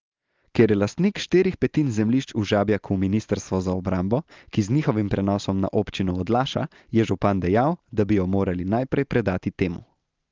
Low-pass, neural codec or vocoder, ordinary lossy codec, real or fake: 7.2 kHz; none; Opus, 32 kbps; real